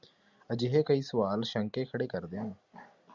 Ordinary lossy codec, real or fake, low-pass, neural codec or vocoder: Opus, 64 kbps; real; 7.2 kHz; none